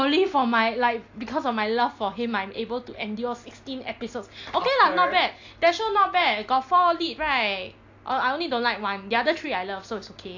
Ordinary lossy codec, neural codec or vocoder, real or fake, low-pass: AAC, 48 kbps; none; real; 7.2 kHz